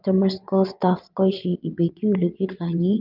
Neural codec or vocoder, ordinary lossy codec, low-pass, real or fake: vocoder, 22.05 kHz, 80 mel bands, WaveNeXt; Opus, 24 kbps; 5.4 kHz; fake